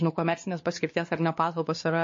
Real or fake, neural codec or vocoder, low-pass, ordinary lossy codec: fake; codec, 16 kHz, 2 kbps, X-Codec, WavLM features, trained on Multilingual LibriSpeech; 7.2 kHz; MP3, 32 kbps